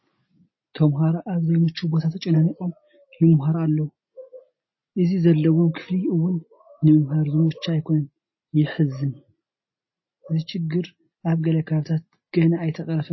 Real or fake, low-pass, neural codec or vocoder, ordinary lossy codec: real; 7.2 kHz; none; MP3, 24 kbps